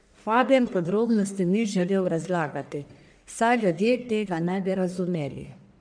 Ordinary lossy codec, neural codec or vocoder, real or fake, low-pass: none; codec, 44.1 kHz, 1.7 kbps, Pupu-Codec; fake; 9.9 kHz